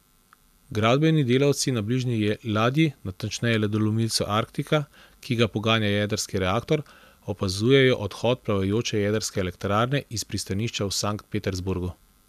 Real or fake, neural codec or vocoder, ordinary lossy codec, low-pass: real; none; none; 14.4 kHz